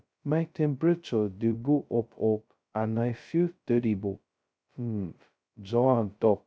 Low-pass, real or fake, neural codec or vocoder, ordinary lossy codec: none; fake; codec, 16 kHz, 0.2 kbps, FocalCodec; none